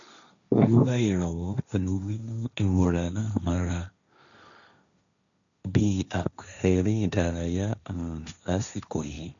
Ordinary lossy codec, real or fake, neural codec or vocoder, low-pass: none; fake; codec, 16 kHz, 1.1 kbps, Voila-Tokenizer; 7.2 kHz